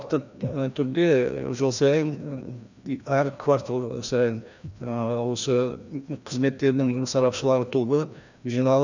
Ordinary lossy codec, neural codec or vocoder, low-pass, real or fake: none; codec, 16 kHz, 1 kbps, FreqCodec, larger model; 7.2 kHz; fake